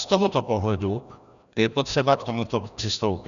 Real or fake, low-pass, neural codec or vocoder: fake; 7.2 kHz; codec, 16 kHz, 1 kbps, FreqCodec, larger model